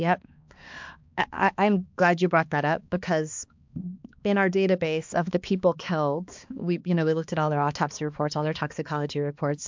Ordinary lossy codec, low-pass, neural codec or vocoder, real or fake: MP3, 64 kbps; 7.2 kHz; codec, 16 kHz, 2 kbps, X-Codec, HuBERT features, trained on balanced general audio; fake